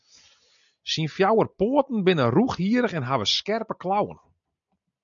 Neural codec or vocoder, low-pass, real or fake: none; 7.2 kHz; real